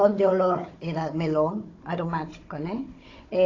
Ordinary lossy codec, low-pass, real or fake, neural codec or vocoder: AAC, 32 kbps; 7.2 kHz; fake; codec, 16 kHz, 16 kbps, FunCodec, trained on Chinese and English, 50 frames a second